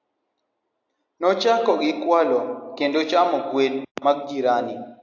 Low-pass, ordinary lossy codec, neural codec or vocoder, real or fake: 7.2 kHz; AAC, 48 kbps; none; real